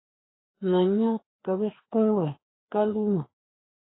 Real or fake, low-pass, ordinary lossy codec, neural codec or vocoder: fake; 7.2 kHz; AAC, 16 kbps; codec, 16 kHz, 2 kbps, FreqCodec, larger model